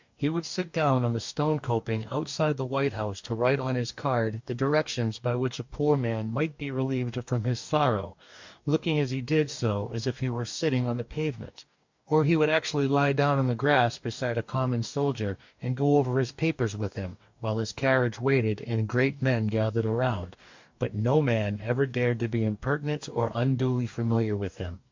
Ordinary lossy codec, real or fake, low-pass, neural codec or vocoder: MP3, 64 kbps; fake; 7.2 kHz; codec, 44.1 kHz, 2.6 kbps, DAC